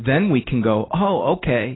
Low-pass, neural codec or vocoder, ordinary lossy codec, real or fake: 7.2 kHz; none; AAC, 16 kbps; real